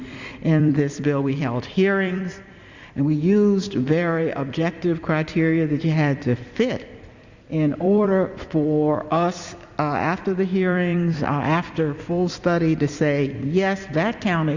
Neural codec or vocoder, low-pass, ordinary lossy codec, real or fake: vocoder, 44.1 kHz, 128 mel bands every 256 samples, BigVGAN v2; 7.2 kHz; Opus, 64 kbps; fake